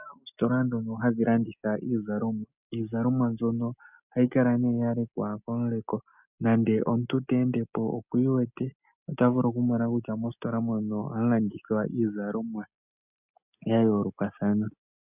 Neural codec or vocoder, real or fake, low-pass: none; real; 3.6 kHz